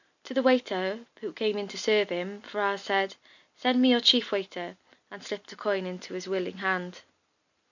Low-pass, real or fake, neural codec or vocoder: 7.2 kHz; real; none